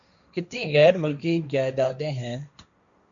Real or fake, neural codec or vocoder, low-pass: fake; codec, 16 kHz, 1.1 kbps, Voila-Tokenizer; 7.2 kHz